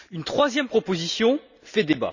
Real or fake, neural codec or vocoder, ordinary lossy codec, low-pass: real; none; none; 7.2 kHz